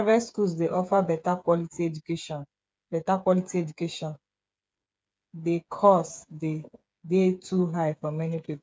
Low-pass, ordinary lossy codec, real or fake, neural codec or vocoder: none; none; fake; codec, 16 kHz, 8 kbps, FreqCodec, smaller model